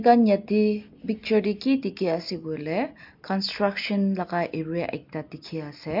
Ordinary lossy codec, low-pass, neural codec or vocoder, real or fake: MP3, 48 kbps; 5.4 kHz; none; real